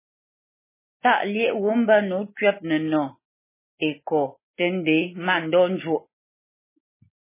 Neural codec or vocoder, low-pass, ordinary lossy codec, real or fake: none; 3.6 kHz; MP3, 16 kbps; real